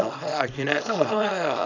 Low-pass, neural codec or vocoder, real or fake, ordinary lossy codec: 7.2 kHz; codec, 24 kHz, 0.9 kbps, WavTokenizer, small release; fake; none